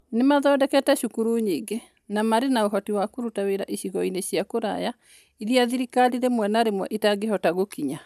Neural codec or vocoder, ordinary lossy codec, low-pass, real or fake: none; none; 14.4 kHz; real